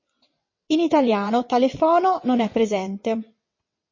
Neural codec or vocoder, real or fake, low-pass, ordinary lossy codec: vocoder, 22.05 kHz, 80 mel bands, WaveNeXt; fake; 7.2 kHz; MP3, 32 kbps